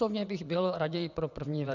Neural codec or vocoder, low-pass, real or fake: vocoder, 22.05 kHz, 80 mel bands, WaveNeXt; 7.2 kHz; fake